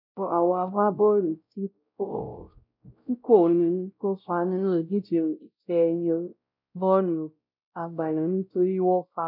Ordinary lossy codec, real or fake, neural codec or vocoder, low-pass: none; fake; codec, 16 kHz, 0.5 kbps, X-Codec, WavLM features, trained on Multilingual LibriSpeech; 5.4 kHz